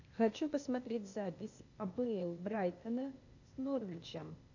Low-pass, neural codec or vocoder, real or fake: 7.2 kHz; codec, 16 kHz, 0.8 kbps, ZipCodec; fake